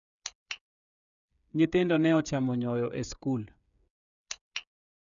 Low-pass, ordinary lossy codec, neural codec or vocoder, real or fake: 7.2 kHz; none; codec, 16 kHz, 8 kbps, FreqCodec, smaller model; fake